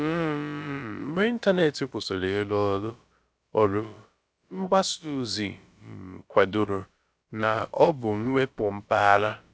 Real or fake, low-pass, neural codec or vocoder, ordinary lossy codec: fake; none; codec, 16 kHz, about 1 kbps, DyCAST, with the encoder's durations; none